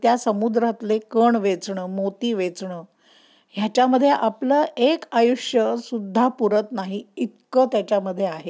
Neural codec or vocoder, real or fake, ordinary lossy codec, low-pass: none; real; none; none